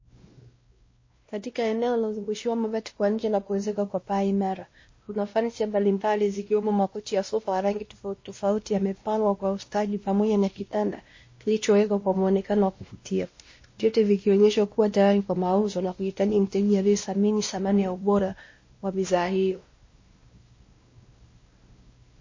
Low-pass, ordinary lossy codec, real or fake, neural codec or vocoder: 7.2 kHz; MP3, 32 kbps; fake; codec, 16 kHz, 1 kbps, X-Codec, WavLM features, trained on Multilingual LibriSpeech